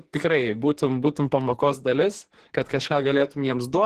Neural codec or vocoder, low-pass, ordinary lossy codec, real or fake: codec, 44.1 kHz, 2.6 kbps, SNAC; 14.4 kHz; Opus, 16 kbps; fake